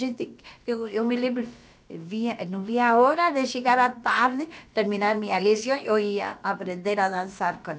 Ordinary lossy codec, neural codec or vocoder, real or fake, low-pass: none; codec, 16 kHz, about 1 kbps, DyCAST, with the encoder's durations; fake; none